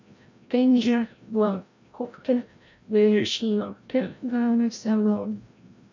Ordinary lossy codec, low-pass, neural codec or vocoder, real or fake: MP3, 64 kbps; 7.2 kHz; codec, 16 kHz, 0.5 kbps, FreqCodec, larger model; fake